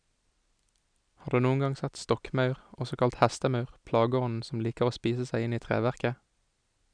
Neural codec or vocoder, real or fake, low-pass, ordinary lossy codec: none; real; 9.9 kHz; none